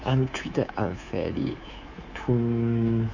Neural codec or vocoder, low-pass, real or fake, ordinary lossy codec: codec, 24 kHz, 3.1 kbps, DualCodec; 7.2 kHz; fake; none